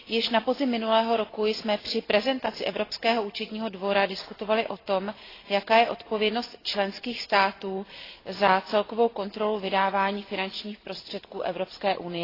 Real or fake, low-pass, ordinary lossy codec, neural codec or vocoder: real; 5.4 kHz; AAC, 24 kbps; none